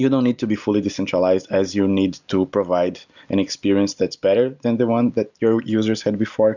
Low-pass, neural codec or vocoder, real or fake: 7.2 kHz; none; real